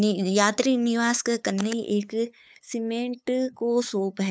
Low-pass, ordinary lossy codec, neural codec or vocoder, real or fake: none; none; codec, 16 kHz, 8 kbps, FunCodec, trained on LibriTTS, 25 frames a second; fake